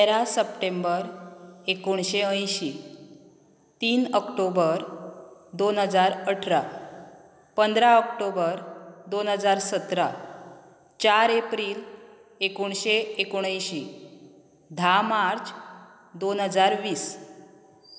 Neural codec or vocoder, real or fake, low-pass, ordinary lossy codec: none; real; none; none